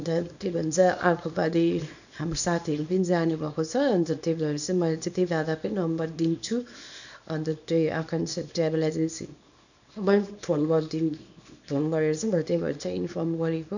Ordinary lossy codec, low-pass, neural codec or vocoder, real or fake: none; 7.2 kHz; codec, 24 kHz, 0.9 kbps, WavTokenizer, small release; fake